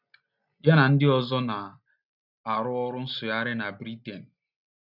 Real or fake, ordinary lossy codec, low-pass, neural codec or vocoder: real; none; 5.4 kHz; none